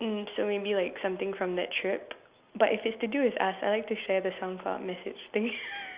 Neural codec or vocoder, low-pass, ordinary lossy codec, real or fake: none; 3.6 kHz; Opus, 64 kbps; real